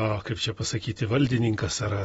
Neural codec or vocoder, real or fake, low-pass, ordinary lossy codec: none; real; 19.8 kHz; AAC, 24 kbps